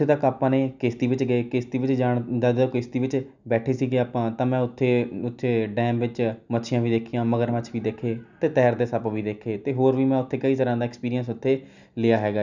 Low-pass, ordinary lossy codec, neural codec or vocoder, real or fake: 7.2 kHz; none; none; real